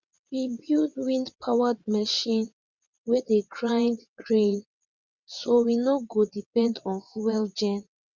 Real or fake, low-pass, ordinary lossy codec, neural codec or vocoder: fake; 7.2 kHz; Opus, 64 kbps; vocoder, 22.05 kHz, 80 mel bands, WaveNeXt